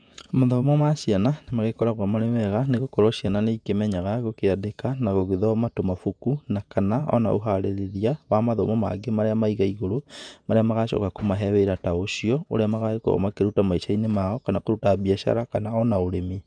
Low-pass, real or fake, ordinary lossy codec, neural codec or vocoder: 9.9 kHz; fake; none; vocoder, 48 kHz, 128 mel bands, Vocos